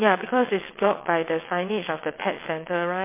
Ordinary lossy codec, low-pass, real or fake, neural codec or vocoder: MP3, 24 kbps; 3.6 kHz; fake; vocoder, 22.05 kHz, 80 mel bands, WaveNeXt